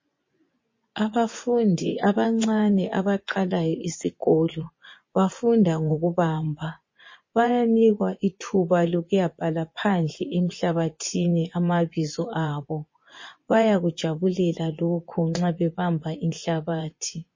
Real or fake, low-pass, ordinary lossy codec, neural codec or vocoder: fake; 7.2 kHz; MP3, 32 kbps; vocoder, 24 kHz, 100 mel bands, Vocos